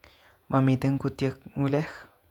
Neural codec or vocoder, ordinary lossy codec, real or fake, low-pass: vocoder, 48 kHz, 128 mel bands, Vocos; none; fake; 19.8 kHz